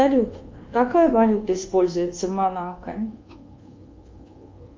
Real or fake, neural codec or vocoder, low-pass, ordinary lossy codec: fake; codec, 24 kHz, 1.2 kbps, DualCodec; 7.2 kHz; Opus, 24 kbps